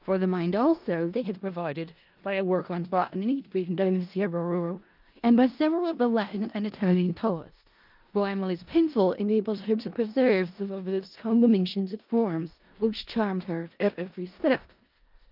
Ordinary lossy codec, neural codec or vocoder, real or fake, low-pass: Opus, 24 kbps; codec, 16 kHz in and 24 kHz out, 0.4 kbps, LongCat-Audio-Codec, four codebook decoder; fake; 5.4 kHz